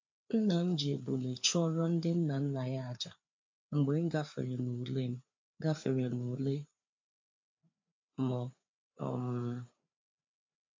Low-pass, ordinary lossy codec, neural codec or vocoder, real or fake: 7.2 kHz; MP3, 64 kbps; codec, 16 kHz, 4 kbps, FreqCodec, larger model; fake